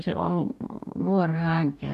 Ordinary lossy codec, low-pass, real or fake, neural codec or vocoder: none; 14.4 kHz; fake; codec, 44.1 kHz, 2.6 kbps, DAC